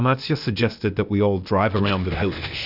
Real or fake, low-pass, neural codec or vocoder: fake; 5.4 kHz; codec, 16 kHz, 0.8 kbps, ZipCodec